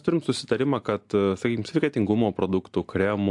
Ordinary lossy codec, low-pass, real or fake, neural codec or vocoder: AAC, 64 kbps; 10.8 kHz; real; none